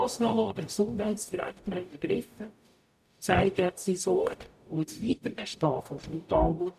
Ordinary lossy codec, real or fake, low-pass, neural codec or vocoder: none; fake; 14.4 kHz; codec, 44.1 kHz, 0.9 kbps, DAC